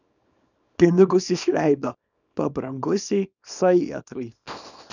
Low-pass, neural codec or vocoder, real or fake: 7.2 kHz; codec, 24 kHz, 0.9 kbps, WavTokenizer, small release; fake